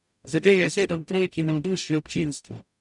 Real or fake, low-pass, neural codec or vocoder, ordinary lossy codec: fake; 10.8 kHz; codec, 44.1 kHz, 0.9 kbps, DAC; none